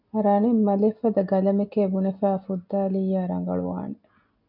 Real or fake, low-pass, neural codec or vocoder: real; 5.4 kHz; none